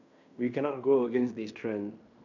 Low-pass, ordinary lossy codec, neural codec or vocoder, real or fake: 7.2 kHz; none; codec, 16 kHz in and 24 kHz out, 0.9 kbps, LongCat-Audio-Codec, fine tuned four codebook decoder; fake